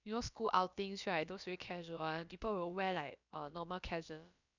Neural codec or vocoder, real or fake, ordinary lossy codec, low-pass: codec, 16 kHz, about 1 kbps, DyCAST, with the encoder's durations; fake; none; 7.2 kHz